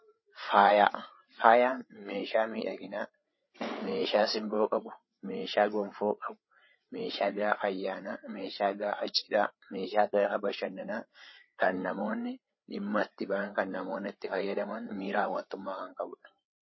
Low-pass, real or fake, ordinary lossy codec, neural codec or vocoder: 7.2 kHz; fake; MP3, 24 kbps; codec, 16 kHz, 8 kbps, FreqCodec, larger model